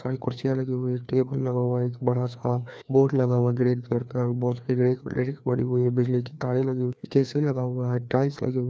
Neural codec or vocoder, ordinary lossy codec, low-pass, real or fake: codec, 16 kHz, 2 kbps, FreqCodec, larger model; none; none; fake